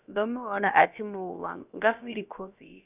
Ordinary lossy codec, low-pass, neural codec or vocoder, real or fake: none; 3.6 kHz; codec, 16 kHz, about 1 kbps, DyCAST, with the encoder's durations; fake